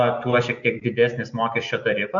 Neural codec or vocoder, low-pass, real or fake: none; 7.2 kHz; real